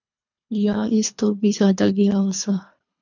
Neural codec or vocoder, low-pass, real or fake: codec, 24 kHz, 3 kbps, HILCodec; 7.2 kHz; fake